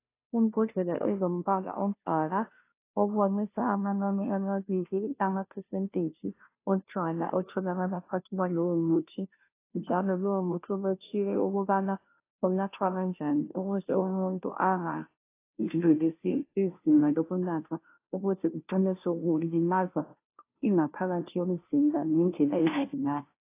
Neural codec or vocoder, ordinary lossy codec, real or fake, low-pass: codec, 16 kHz, 0.5 kbps, FunCodec, trained on Chinese and English, 25 frames a second; AAC, 24 kbps; fake; 3.6 kHz